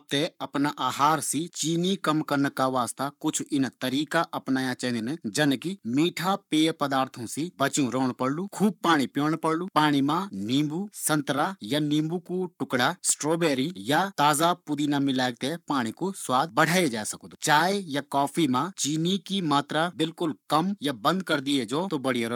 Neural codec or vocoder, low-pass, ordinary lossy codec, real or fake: codec, 44.1 kHz, 7.8 kbps, Pupu-Codec; 19.8 kHz; none; fake